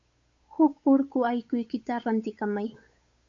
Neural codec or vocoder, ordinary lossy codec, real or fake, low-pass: codec, 16 kHz, 8 kbps, FunCodec, trained on Chinese and English, 25 frames a second; AAC, 48 kbps; fake; 7.2 kHz